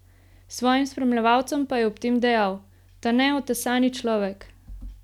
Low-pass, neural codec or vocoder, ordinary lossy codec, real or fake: 19.8 kHz; none; none; real